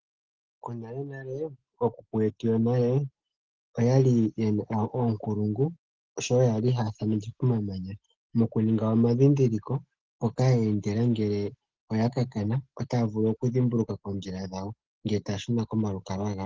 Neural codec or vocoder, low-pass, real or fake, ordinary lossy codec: none; 7.2 kHz; real; Opus, 16 kbps